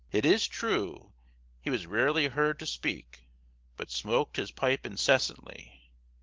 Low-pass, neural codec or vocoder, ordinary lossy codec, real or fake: 7.2 kHz; none; Opus, 16 kbps; real